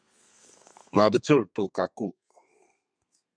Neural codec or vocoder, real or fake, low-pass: codec, 32 kHz, 1.9 kbps, SNAC; fake; 9.9 kHz